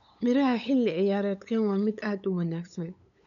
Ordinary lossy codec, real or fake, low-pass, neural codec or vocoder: none; fake; 7.2 kHz; codec, 16 kHz, 8 kbps, FunCodec, trained on LibriTTS, 25 frames a second